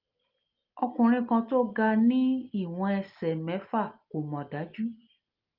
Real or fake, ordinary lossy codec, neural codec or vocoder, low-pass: real; Opus, 32 kbps; none; 5.4 kHz